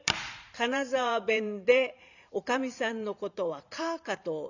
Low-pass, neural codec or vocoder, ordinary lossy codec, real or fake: 7.2 kHz; vocoder, 44.1 kHz, 128 mel bands every 512 samples, BigVGAN v2; none; fake